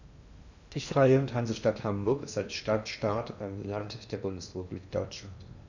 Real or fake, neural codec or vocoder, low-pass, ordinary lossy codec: fake; codec, 16 kHz in and 24 kHz out, 0.8 kbps, FocalCodec, streaming, 65536 codes; 7.2 kHz; none